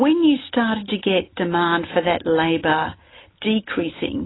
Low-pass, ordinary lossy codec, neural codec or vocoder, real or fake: 7.2 kHz; AAC, 16 kbps; none; real